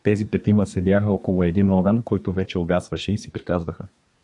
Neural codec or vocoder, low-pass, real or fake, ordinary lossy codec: codec, 24 kHz, 1 kbps, SNAC; 10.8 kHz; fake; AAC, 64 kbps